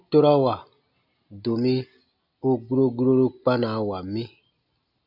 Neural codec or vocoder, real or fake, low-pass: none; real; 5.4 kHz